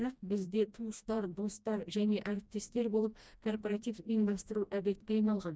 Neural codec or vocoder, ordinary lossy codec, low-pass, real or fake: codec, 16 kHz, 1 kbps, FreqCodec, smaller model; none; none; fake